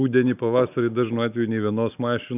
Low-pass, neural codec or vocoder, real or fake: 3.6 kHz; none; real